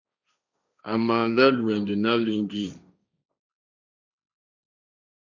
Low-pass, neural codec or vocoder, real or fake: 7.2 kHz; codec, 16 kHz, 1.1 kbps, Voila-Tokenizer; fake